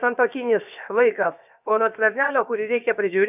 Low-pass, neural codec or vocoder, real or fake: 3.6 kHz; codec, 16 kHz, 0.7 kbps, FocalCodec; fake